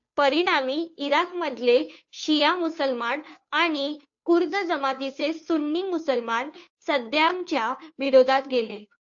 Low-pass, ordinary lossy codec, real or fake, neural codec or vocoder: 7.2 kHz; AAC, 64 kbps; fake; codec, 16 kHz, 2 kbps, FunCodec, trained on Chinese and English, 25 frames a second